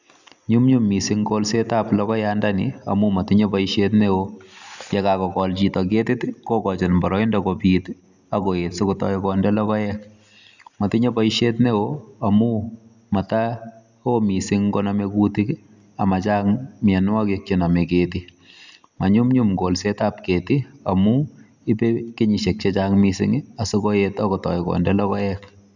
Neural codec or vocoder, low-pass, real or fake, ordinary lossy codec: none; 7.2 kHz; real; none